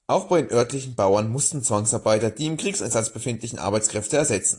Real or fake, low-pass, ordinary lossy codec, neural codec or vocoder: real; 10.8 kHz; AAC, 48 kbps; none